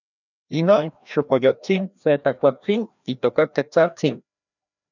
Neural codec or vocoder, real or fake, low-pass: codec, 16 kHz, 1 kbps, FreqCodec, larger model; fake; 7.2 kHz